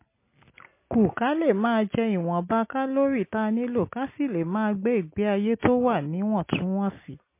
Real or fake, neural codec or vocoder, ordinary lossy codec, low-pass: real; none; MP3, 24 kbps; 3.6 kHz